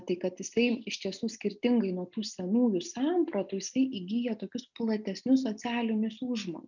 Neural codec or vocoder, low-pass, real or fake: none; 7.2 kHz; real